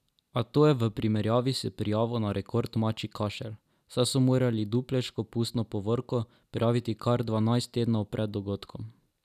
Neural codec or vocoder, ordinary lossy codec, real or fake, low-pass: none; none; real; 14.4 kHz